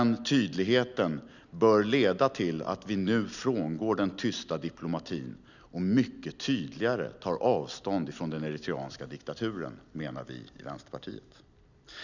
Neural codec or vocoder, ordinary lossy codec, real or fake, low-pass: none; none; real; 7.2 kHz